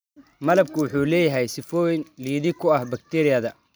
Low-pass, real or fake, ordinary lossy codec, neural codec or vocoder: none; real; none; none